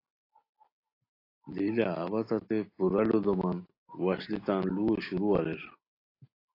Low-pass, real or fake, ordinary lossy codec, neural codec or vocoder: 5.4 kHz; real; AAC, 32 kbps; none